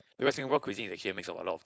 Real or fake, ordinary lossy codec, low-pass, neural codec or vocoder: fake; none; none; codec, 16 kHz, 4.8 kbps, FACodec